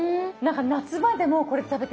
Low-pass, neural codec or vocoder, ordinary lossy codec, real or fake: none; none; none; real